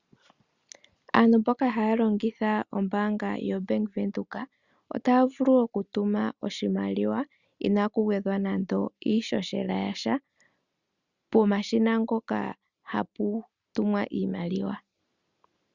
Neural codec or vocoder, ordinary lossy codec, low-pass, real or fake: none; Opus, 64 kbps; 7.2 kHz; real